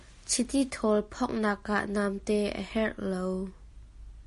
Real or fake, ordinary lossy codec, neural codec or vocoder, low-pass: real; MP3, 48 kbps; none; 14.4 kHz